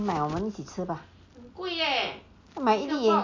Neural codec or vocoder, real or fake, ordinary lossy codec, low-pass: none; real; MP3, 64 kbps; 7.2 kHz